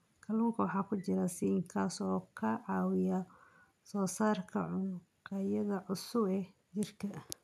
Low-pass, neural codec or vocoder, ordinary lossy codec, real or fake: 14.4 kHz; none; none; real